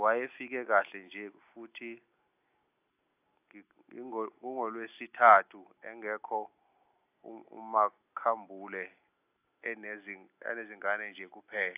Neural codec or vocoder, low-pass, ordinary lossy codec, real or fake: none; 3.6 kHz; AAC, 32 kbps; real